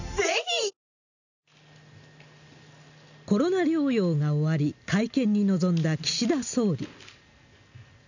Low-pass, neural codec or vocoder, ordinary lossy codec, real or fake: 7.2 kHz; none; none; real